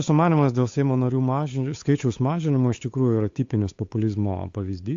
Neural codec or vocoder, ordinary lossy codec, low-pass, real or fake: codec, 16 kHz, 8 kbps, FunCodec, trained on Chinese and English, 25 frames a second; AAC, 48 kbps; 7.2 kHz; fake